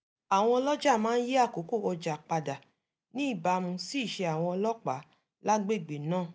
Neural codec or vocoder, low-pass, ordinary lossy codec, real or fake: none; none; none; real